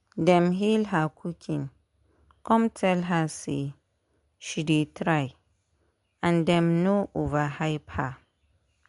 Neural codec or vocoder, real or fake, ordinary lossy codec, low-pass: none; real; MP3, 64 kbps; 10.8 kHz